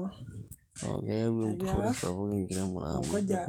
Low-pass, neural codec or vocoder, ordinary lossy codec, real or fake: 19.8 kHz; codec, 44.1 kHz, 7.8 kbps, DAC; none; fake